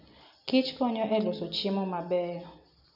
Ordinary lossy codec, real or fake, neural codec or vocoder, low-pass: none; real; none; 5.4 kHz